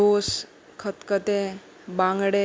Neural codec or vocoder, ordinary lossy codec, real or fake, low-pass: none; none; real; none